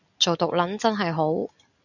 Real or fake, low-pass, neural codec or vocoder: real; 7.2 kHz; none